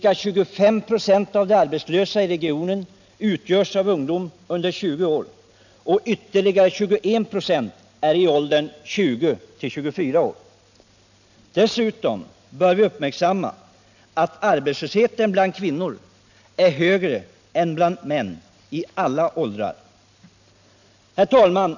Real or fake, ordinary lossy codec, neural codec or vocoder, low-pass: real; none; none; 7.2 kHz